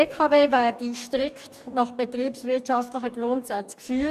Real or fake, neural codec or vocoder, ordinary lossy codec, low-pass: fake; codec, 44.1 kHz, 2.6 kbps, DAC; none; 14.4 kHz